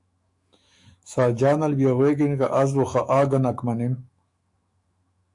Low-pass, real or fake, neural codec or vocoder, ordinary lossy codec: 10.8 kHz; fake; autoencoder, 48 kHz, 128 numbers a frame, DAC-VAE, trained on Japanese speech; AAC, 64 kbps